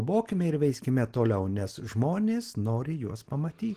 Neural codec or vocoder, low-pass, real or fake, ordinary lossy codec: none; 14.4 kHz; real; Opus, 16 kbps